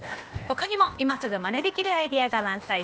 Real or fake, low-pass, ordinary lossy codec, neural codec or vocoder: fake; none; none; codec, 16 kHz, 0.8 kbps, ZipCodec